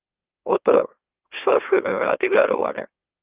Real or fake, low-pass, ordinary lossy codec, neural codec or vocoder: fake; 3.6 kHz; Opus, 24 kbps; autoencoder, 44.1 kHz, a latent of 192 numbers a frame, MeloTTS